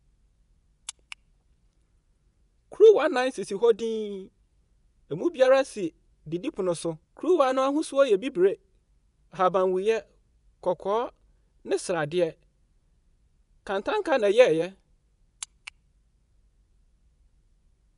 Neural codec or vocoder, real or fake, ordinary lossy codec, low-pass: vocoder, 24 kHz, 100 mel bands, Vocos; fake; none; 10.8 kHz